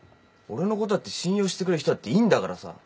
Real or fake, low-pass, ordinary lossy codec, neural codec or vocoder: real; none; none; none